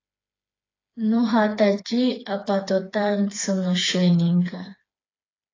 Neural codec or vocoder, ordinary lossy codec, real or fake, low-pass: codec, 16 kHz, 4 kbps, FreqCodec, smaller model; AAC, 32 kbps; fake; 7.2 kHz